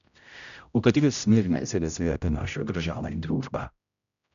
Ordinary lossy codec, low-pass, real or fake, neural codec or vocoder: none; 7.2 kHz; fake; codec, 16 kHz, 0.5 kbps, X-Codec, HuBERT features, trained on general audio